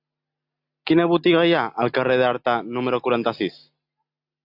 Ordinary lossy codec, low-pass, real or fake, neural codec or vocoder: AAC, 48 kbps; 5.4 kHz; real; none